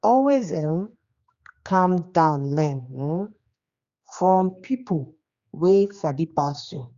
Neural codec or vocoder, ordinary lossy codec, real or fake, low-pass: codec, 16 kHz, 2 kbps, X-Codec, HuBERT features, trained on general audio; Opus, 64 kbps; fake; 7.2 kHz